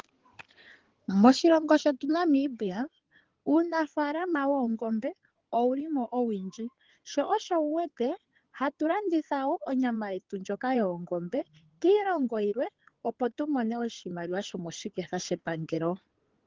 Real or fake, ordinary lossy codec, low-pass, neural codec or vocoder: fake; Opus, 16 kbps; 7.2 kHz; codec, 16 kHz in and 24 kHz out, 2.2 kbps, FireRedTTS-2 codec